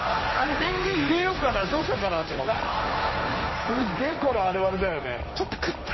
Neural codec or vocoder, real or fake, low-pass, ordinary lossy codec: codec, 16 kHz, 1.1 kbps, Voila-Tokenizer; fake; 7.2 kHz; MP3, 24 kbps